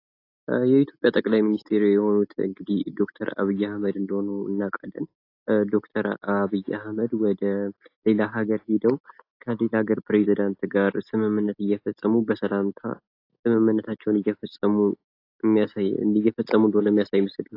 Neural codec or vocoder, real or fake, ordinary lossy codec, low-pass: none; real; AAC, 32 kbps; 5.4 kHz